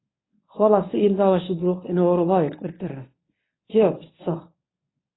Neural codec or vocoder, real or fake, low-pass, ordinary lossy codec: codec, 24 kHz, 0.9 kbps, WavTokenizer, medium speech release version 1; fake; 7.2 kHz; AAC, 16 kbps